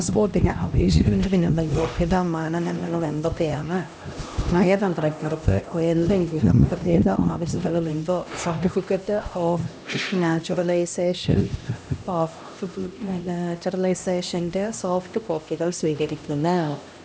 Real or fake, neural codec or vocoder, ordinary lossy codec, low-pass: fake; codec, 16 kHz, 1 kbps, X-Codec, HuBERT features, trained on LibriSpeech; none; none